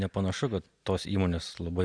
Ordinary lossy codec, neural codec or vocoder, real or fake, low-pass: Opus, 64 kbps; none; real; 9.9 kHz